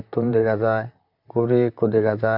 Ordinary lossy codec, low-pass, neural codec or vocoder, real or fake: none; 5.4 kHz; vocoder, 44.1 kHz, 128 mel bands, Pupu-Vocoder; fake